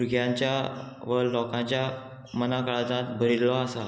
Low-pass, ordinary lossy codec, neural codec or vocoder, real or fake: none; none; none; real